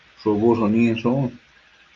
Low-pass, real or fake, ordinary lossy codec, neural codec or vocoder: 7.2 kHz; real; Opus, 32 kbps; none